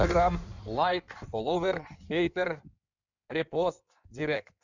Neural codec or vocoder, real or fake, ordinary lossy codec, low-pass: codec, 16 kHz in and 24 kHz out, 1.1 kbps, FireRedTTS-2 codec; fake; none; 7.2 kHz